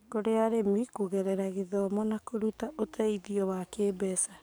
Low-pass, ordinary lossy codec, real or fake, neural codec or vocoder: none; none; fake; codec, 44.1 kHz, 7.8 kbps, DAC